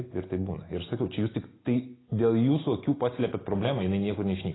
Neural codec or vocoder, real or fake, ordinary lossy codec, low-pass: none; real; AAC, 16 kbps; 7.2 kHz